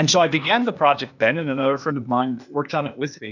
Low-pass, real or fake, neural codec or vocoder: 7.2 kHz; fake; codec, 16 kHz, 0.8 kbps, ZipCodec